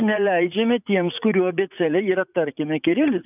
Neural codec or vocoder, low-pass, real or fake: vocoder, 44.1 kHz, 80 mel bands, Vocos; 3.6 kHz; fake